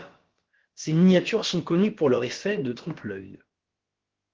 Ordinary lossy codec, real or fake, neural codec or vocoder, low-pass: Opus, 16 kbps; fake; codec, 16 kHz, about 1 kbps, DyCAST, with the encoder's durations; 7.2 kHz